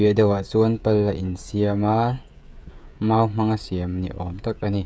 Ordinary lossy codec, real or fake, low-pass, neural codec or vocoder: none; fake; none; codec, 16 kHz, 16 kbps, FreqCodec, smaller model